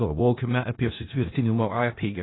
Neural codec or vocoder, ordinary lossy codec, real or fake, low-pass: codec, 16 kHz in and 24 kHz out, 0.4 kbps, LongCat-Audio-Codec, four codebook decoder; AAC, 16 kbps; fake; 7.2 kHz